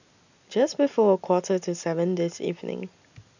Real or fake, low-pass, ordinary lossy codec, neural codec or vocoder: real; 7.2 kHz; none; none